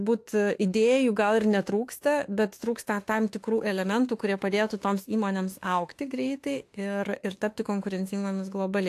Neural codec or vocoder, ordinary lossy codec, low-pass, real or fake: autoencoder, 48 kHz, 32 numbers a frame, DAC-VAE, trained on Japanese speech; AAC, 64 kbps; 14.4 kHz; fake